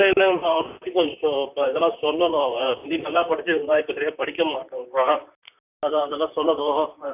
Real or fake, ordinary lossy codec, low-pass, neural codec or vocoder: fake; none; 3.6 kHz; vocoder, 44.1 kHz, 128 mel bands, Pupu-Vocoder